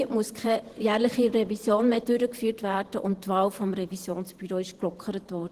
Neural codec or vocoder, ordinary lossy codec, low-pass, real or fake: vocoder, 48 kHz, 128 mel bands, Vocos; Opus, 16 kbps; 14.4 kHz; fake